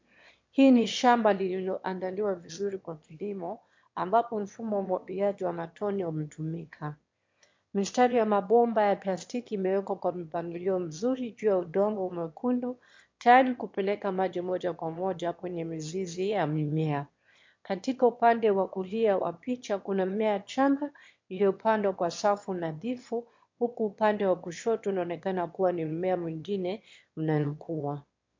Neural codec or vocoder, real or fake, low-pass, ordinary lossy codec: autoencoder, 22.05 kHz, a latent of 192 numbers a frame, VITS, trained on one speaker; fake; 7.2 kHz; MP3, 48 kbps